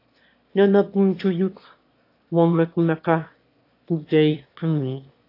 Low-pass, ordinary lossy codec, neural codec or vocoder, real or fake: 5.4 kHz; AAC, 32 kbps; autoencoder, 22.05 kHz, a latent of 192 numbers a frame, VITS, trained on one speaker; fake